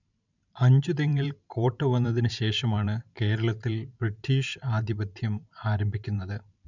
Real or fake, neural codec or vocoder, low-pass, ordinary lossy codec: fake; vocoder, 44.1 kHz, 128 mel bands every 512 samples, BigVGAN v2; 7.2 kHz; none